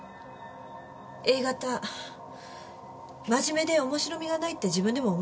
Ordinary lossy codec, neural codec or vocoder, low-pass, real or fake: none; none; none; real